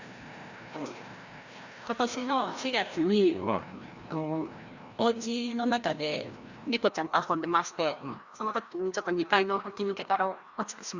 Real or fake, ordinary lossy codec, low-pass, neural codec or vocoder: fake; Opus, 64 kbps; 7.2 kHz; codec, 16 kHz, 1 kbps, FreqCodec, larger model